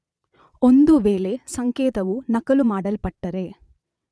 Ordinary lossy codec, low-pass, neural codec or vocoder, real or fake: none; none; vocoder, 22.05 kHz, 80 mel bands, Vocos; fake